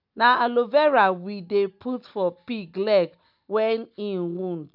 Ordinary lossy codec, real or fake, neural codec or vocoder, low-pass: none; real; none; 5.4 kHz